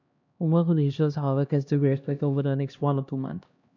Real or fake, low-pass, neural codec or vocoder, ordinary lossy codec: fake; 7.2 kHz; codec, 16 kHz, 1 kbps, X-Codec, HuBERT features, trained on LibriSpeech; none